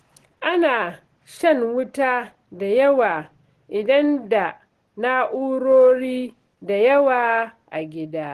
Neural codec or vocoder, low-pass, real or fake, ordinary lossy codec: none; 14.4 kHz; real; Opus, 16 kbps